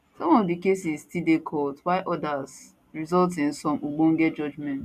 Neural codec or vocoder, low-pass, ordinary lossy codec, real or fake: none; 14.4 kHz; none; real